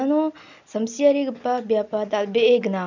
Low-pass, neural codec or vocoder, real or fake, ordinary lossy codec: 7.2 kHz; none; real; none